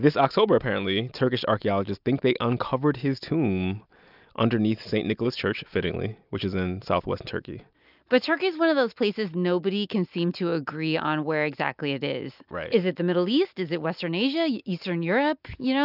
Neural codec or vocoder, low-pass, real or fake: none; 5.4 kHz; real